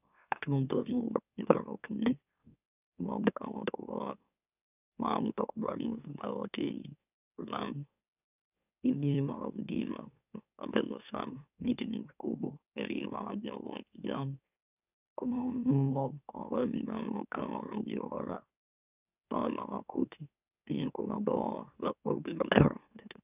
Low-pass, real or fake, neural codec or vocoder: 3.6 kHz; fake; autoencoder, 44.1 kHz, a latent of 192 numbers a frame, MeloTTS